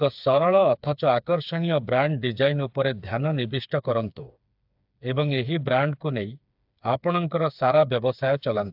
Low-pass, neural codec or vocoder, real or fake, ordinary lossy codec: 5.4 kHz; codec, 16 kHz, 4 kbps, FreqCodec, smaller model; fake; AAC, 48 kbps